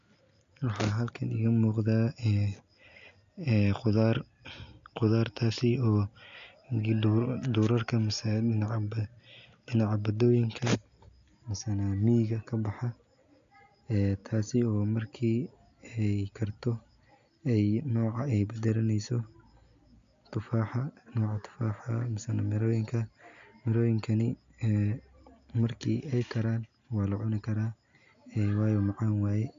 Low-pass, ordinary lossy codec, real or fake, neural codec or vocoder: 7.2 kHz; AAC, 64 kbps; real; none